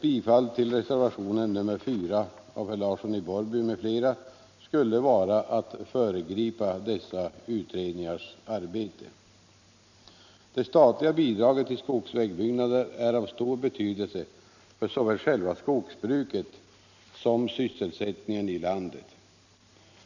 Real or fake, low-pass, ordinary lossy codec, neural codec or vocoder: real; 7.2 kHz; none; none